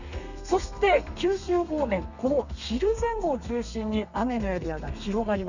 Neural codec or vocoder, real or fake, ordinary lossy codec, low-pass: codec, 32 kHz, 1.9 kbps, SNAC; fake; none; 7.2 kHz